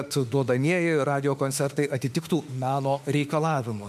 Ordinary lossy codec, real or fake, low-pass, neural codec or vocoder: MP3, 96 kbps; fake; 14.4 kHz; autoencoder, 48 kHz, 32 numbers a frame, DAC-VAE, trained on Japanese speech